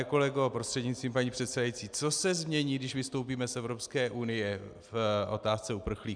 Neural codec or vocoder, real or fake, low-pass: none; real; 9.9 kHz